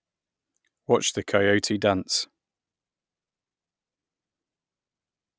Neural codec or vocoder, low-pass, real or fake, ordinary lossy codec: none; none; real; none